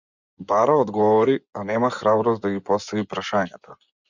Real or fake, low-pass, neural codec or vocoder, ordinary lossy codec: fake; 7.2 kHz; vocoder, 24 kHz, 100 mel bands, Vocos; Opus, 64 kbps